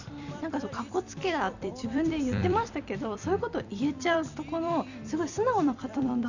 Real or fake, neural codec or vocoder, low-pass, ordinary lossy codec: fake; vocoder, 44.1 kHz, 128 mel bands every 256 samples, BigVGAN v2; 7.2 kHz; none